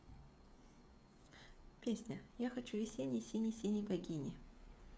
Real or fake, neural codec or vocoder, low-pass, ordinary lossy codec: fake; codec, 16 kHz, 16 kbps, FreqCodec, smaller model; none; none